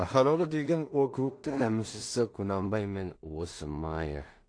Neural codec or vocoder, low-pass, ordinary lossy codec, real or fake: codec, 16 kHz in and 24 kHz out, 0.4 kbps, LongCat-Audio-Codec, two codebook decoder; 9.9 kHz; AAC, 48 kbps; fake